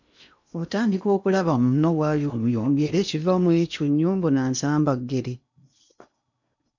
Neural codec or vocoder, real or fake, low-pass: codec, 16 kHz in and 24 kHz out, 0.8 kbps, FocalCodec, streaming, 65536 codes; fake; 7.2 kHz